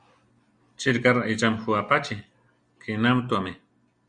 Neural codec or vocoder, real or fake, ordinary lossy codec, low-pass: none; real; Opus, 64 kbps; 9.9 kHz